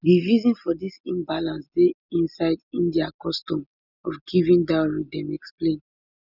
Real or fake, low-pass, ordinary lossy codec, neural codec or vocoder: real; 5.4 kHz; none; none